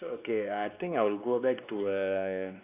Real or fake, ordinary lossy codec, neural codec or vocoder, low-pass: fake; AAC, 32 kbps; codec, 16 kHz, 2 kbps, X-Codec, WavLM features, trained on Multilingual LibriSpeech; 3.6 kHz